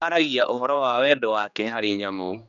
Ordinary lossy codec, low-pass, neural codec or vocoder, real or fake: none; 7.2 kHz; codec, 16 kHz, 2 kbps, X-Codec, HuBERT features, trained on general audio; fake